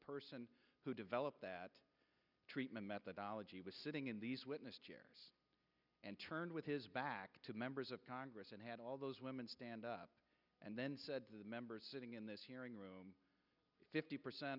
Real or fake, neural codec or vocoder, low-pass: real; none; 5.4 kHz